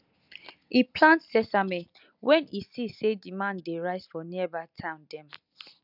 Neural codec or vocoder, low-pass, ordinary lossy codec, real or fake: none; 5.4 kHz; none; real